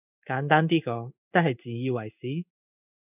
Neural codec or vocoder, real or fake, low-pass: codec, 16 kHz in and 24 kHz out, 1 kbps, XY-Tokenizer; fake; 3.6 kHz